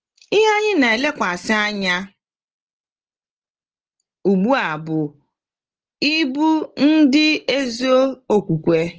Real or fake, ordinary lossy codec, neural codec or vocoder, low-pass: real; Opus, 16 kbps; none; 7.2 kHz